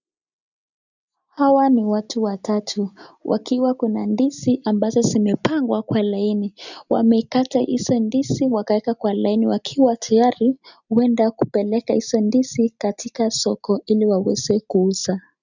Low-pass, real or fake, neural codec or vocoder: 7.2 kHz; real; none